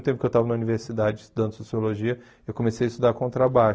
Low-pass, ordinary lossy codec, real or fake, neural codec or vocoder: none; none; real; none